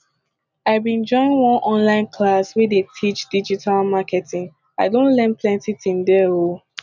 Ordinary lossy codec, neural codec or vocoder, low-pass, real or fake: none; none; 7.2 kHz; real